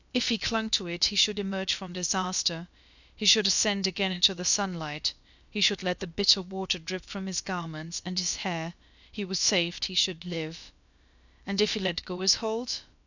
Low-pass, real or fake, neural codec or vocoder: 7.2 kHz; fake; codec, 16 kHz, about 1 kbps, DyCAST, with the encoder's durations